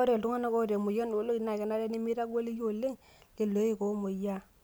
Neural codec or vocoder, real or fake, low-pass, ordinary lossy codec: none; real; none; none